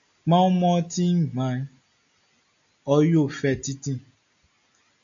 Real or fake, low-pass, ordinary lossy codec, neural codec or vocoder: real; 7.2 kHz; AAC, 64 kbps; none